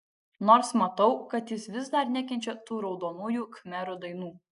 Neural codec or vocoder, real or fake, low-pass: none; real; 10.8 kHz